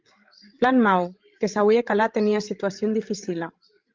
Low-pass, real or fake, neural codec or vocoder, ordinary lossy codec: 7.2 kHz; fake; codec, 16 kHz, 16 kbps, FreqCodec, larger model; Opus, 24 kbps